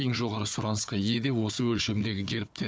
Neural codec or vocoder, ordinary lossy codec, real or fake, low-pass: codec, 16 kHz, 4 kbps, FunCodec, trained on Chinese and English, 50 frames a second; none; fake; none